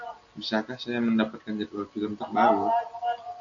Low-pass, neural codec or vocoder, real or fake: 7.2 kHz; none; real